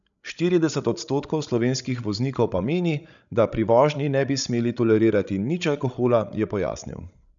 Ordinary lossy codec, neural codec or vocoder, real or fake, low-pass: none; codec, 16 kHz, 16 kbps, FreqCodec, larger model; fake; 7.2 kHz